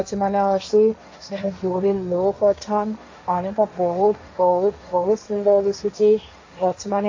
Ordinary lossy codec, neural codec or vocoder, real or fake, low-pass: AAC, 48 kbps; codec, 16 kHz, 1.1 kbps, Voila-Tokenizer; fake; 7.2 kHz